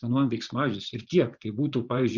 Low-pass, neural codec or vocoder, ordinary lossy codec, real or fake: 7.2 kHz; vocoder, 24 kHz, 100 mel bands, Vocos; Opus, 64 kbps; fake